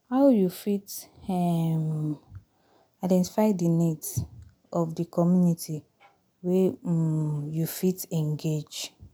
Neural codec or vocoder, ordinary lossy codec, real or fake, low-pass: none; none; real; none